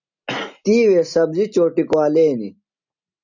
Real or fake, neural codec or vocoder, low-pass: real; none; 7.2 kHz